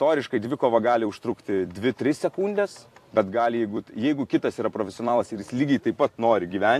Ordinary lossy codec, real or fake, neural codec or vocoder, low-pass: AAC, 64 kbps; fake; vocoder, 44.1 kHz, 128 mel bands every 256 samples, BigVGAN v2; 14.4 kHz